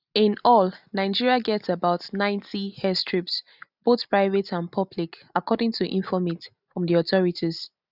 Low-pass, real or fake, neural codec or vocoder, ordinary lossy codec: 5.4 kHz; real; none; none